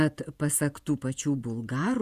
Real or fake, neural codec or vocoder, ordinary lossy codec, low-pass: fake; vocoder, 48 kHz, 128 mel bands, Vocos; Opus, 64 kbps; 14.4 kHz